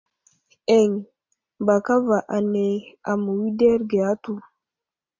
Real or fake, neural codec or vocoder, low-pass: real; none; 7.2 kHz